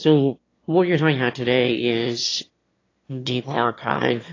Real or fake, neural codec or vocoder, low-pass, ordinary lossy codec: fake; autoencoder, 22.05 kHz, a latent of 192 numbers a frame, VITS, trained on one speaker; 7.2 kHz; AAC, 32 kbps